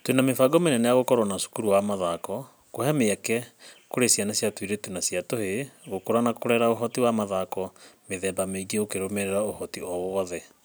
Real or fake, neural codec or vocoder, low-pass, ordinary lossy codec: real; none; none; none